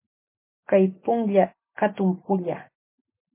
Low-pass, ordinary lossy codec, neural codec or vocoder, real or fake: 3.6 kHz; MP3, 24 kbps; none; real